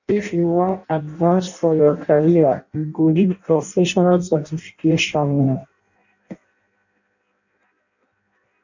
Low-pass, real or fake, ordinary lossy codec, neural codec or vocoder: 7.2 kHz; fake; none; codec, 16 kHz in and 24 kHz out, 0.6 kbps, FireRedTTS-2 codec